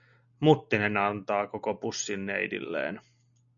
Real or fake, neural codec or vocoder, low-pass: real; none; 7.2 kHz